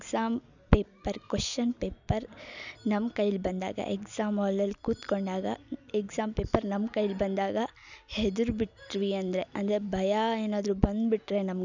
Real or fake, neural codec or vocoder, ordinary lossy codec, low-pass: real; none; none; 7.2 kHz